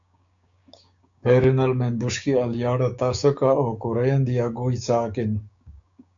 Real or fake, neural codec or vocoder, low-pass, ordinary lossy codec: fake; codec, 16 kHz, 6 kbps, DAC; 7.2 kHz; MP3, 64 kbps